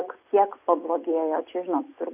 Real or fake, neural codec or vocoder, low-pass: real; none; 3.6 kHz